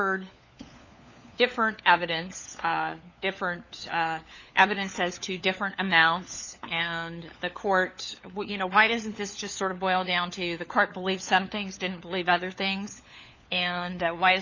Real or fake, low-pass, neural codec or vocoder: fake; 7.2 kHz; codec, 16 kHz, 4 kbps, FunCodec, trained on LibriTTS, 50 frames a second